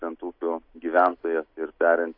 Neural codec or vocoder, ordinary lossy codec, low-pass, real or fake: none; MP3, 32 kbps; 5.4 kHz; real